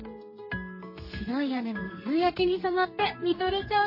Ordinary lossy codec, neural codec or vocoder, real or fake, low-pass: MP3, 24 kbps; codec, 32 kHz, 1.9 kbps, SNAC; fake; 5.4 kHz